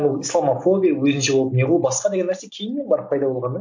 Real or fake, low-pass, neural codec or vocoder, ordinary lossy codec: real; 7.2 kHz; none; MP3, 48 kbps